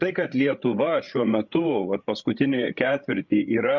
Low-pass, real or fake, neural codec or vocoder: 7.2 kHz; fake; codec, 16 kHz, 8 kbps, FreqCodec, larger model